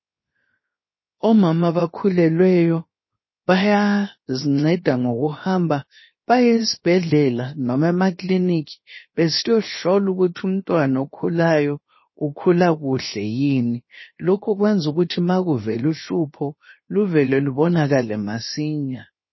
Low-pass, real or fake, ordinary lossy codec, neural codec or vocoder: 7.2 kHz; fake; MP3, 24 kbps; codec, 16 kHz, 0.7 kbps, FocalCodec